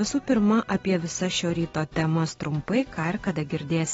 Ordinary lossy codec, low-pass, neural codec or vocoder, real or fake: AAC, 24 kbps; 19.8 kHz; none; real